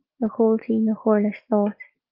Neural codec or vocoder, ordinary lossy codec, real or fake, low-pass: none; Opus, 32 kbps; real; 5.4 kHz